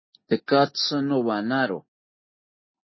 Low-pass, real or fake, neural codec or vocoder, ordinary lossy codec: 7.2 kHz; real; none; MP3, 24 kbps